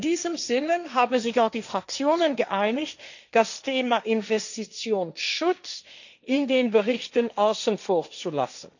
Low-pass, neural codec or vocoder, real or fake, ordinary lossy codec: 7.2 kHz; codec, 16 kHz, 1.1 kbps, Voila-Tokenizer; fake; none